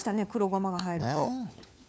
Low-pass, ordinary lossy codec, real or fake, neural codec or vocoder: none; none; fake; codec, 16 kHz, 4 kbps, FunCodec, trained on LibriTTS, 50 frames a second